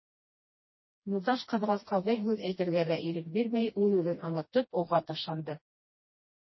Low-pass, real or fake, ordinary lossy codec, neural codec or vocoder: 7.2 kHz; fake; MP3, 24 kbps; codec, 16 kHz, 1 kbps, FreqCodec, smaller model